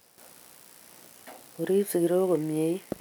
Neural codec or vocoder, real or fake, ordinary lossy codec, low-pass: none; real; none; none